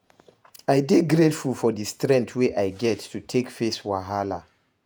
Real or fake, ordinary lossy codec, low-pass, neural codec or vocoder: fake; none; none; vocoder, 48 kHz, 128 mel bands, Vocos